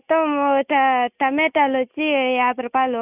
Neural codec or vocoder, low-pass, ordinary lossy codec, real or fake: none; 3.6 kHz; none; real